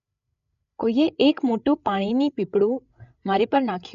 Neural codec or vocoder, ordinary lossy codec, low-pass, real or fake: codec, 16 kHz, 8 kbps, FreqCodec, larger model; none; 7.2 kHz; fake